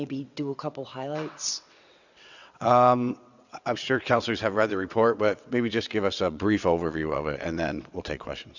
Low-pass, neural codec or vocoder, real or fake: 7.2 kHz; vocoder, 22.05 kHz, 80 mel bands, WaveNeXt; fake